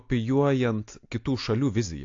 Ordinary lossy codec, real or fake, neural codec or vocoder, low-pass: AAC, 48 kbps; real; none; 7.2 kHz